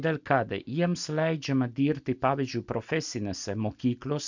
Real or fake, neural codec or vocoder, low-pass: real; none; 7.2 kHz